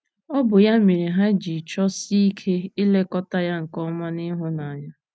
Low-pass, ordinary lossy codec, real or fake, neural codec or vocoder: none; none; real; none